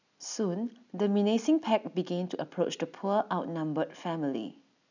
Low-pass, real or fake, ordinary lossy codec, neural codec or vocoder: 7.2 kHz; real; none; none